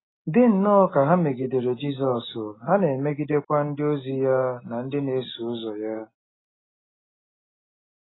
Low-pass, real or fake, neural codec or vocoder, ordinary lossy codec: 7.2 kHz; real; none; AAC, 16 kbps